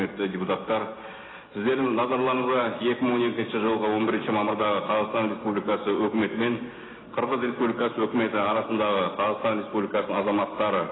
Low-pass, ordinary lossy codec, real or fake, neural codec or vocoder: 7.2 kHz; AAC, 16 kbps; real; none